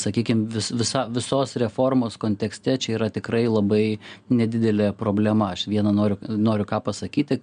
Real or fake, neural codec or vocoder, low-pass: real; none; 9.9 kHz